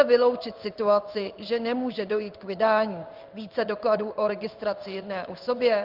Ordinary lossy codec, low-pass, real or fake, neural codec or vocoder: Opus, 24 kbps; 5.4 kHz; fake; codec, 16 kHz in and 24 kHz out, 1 kbps, XY-Tokenizer